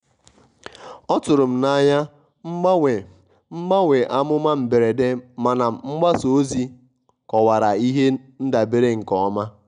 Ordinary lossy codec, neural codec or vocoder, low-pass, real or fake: none; none; 9.9 kHz; real